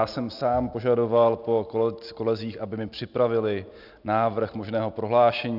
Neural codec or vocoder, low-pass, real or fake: none; 5.4 kHz; real